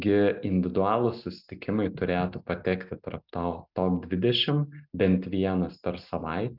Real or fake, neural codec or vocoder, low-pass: real; none; 5.4 kHz